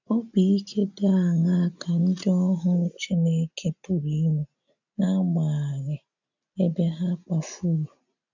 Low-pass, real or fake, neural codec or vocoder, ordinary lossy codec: 7.2 kHz; real; none; none